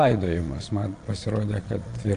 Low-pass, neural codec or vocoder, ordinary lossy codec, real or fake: 9.9 kHz; vocoder, 22.05 kHz, 80 mel bands, Vocos; AAC, 48 kbps; fake